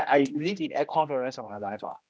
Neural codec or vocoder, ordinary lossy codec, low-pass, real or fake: codec, 16 kHz, 1 kbps, X-Codec, HuBERT features, trained on general audio; none; none; fake